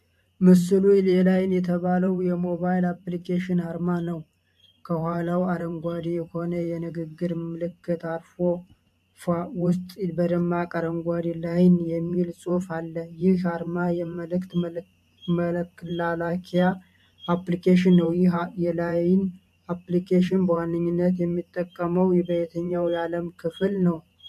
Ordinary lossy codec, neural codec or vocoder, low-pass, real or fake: MP3, 64 kbps; vocoder, 44.1 kHz, 128 mel bands every 512 samples, BigVGAN v2; 14.4 kHz; fake